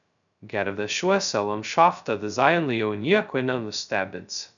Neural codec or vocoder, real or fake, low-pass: codec, 16 kHz, 0.2 kbps, FocalCodec; fake; 7.2 kHz